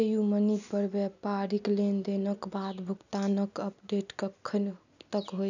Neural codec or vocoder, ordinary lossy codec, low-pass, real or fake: none; none; 7.2 kHz; real